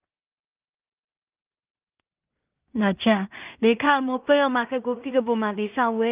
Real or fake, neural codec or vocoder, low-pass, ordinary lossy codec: fake; codec, 16 kHz in and 24 kHz out, 0.4 kbps, LongCat-Audio-Codec, two codebook decoder; 3.6 kHz; Opus, 32 kbps